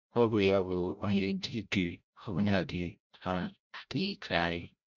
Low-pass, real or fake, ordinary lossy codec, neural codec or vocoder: 7.2 kHz; fake; none; codec, 16 kHz, 0.5 kbps, FreqCodec, larger model